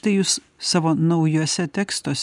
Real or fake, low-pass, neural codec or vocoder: real; 10.8 kHz; none